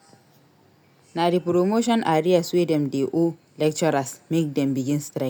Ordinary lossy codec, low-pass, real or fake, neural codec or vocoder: none; none; real; none